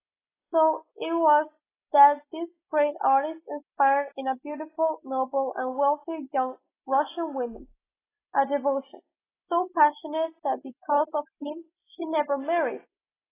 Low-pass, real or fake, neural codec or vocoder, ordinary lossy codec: 3.6 kHz; real; none; AAC, 16 kbps